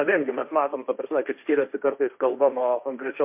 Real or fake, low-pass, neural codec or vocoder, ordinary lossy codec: fake; 3.6 kHz; codec, 16 kHz, 1.1 kbps, Voila-Tokenizer; MP3, 32 kbps